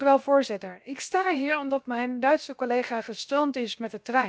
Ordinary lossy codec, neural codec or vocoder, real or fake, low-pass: none; codec, 16 kHz, 0.7 kbps, FocalCodec; fake; none